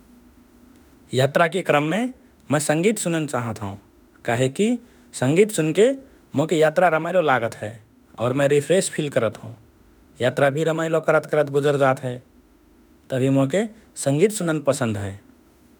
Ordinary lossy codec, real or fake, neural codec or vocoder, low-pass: none; fake; autoencoder, 48 kHz, 32 numbers a frame, DAC-VAE, trained on Japanese speech; none